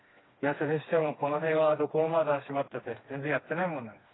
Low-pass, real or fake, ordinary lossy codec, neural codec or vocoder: 7.2 kHz; fake; AAC, 16 kbps; codec, 16 kHz, 2 kbps, FreqCodec, smaller model